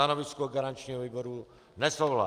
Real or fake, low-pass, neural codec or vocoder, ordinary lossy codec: real; 14.4 kHz; none; Opus, 32 kbps